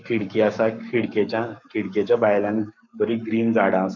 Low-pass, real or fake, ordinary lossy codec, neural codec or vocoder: 7.2 kHz; fake; none; codec, 44.1 kHz, 7.8 kbps, Pupu-Codec